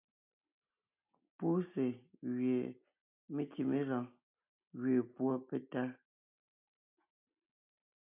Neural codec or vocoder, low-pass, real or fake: none; 3.6 kHz; real